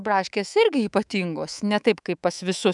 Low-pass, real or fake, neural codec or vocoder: 10.8 kHz; fake; codec, 24 kHz, 3.1 kbps, DualCodec